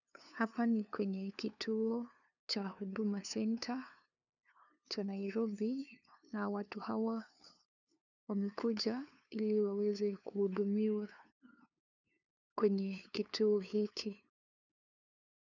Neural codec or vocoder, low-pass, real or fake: codec, 16 kHz, 2 kbps, FunCodec, trained on LibriTTS, 25 frames a second; 7.2 kHz; fake